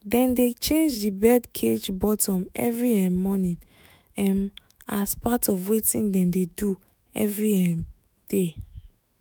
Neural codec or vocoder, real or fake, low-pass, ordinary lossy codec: autoencoder, 48 kHz, 128 numbers a frame, DAC-VAE, trained on Japanese speech; fake; none; none